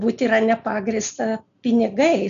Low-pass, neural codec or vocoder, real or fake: 7.2 kHz; none; real